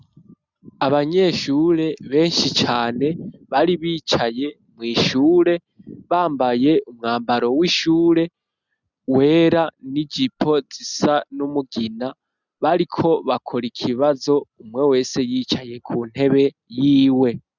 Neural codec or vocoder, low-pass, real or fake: none; 7.2 kHz; real